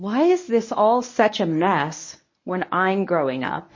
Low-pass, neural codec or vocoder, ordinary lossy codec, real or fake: 7.2 kHz; codec, 24 kHz, 0.9 kbps, WavTokenizer, medium speech release version 1; MP3, 32 kbps; fake